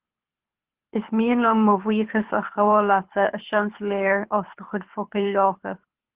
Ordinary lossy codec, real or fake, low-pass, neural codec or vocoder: Opus, 16 kbps; fake; 3.6 kHz; codec, 24 kHz, 6 kbps, HILCodec